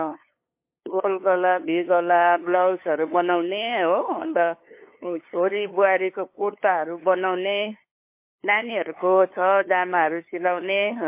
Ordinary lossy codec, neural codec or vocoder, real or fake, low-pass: MP3, 24 kbps; codec, 16 kHz, 2 kbps, FunCodec, trained on LibriTTS, 25 frames a second; fake; 3.6 kHz